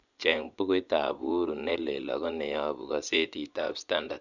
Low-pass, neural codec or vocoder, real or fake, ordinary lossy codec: 7.2 kHz; vocoder, 24 kHz, 100 mel bands, Vocos; fake; none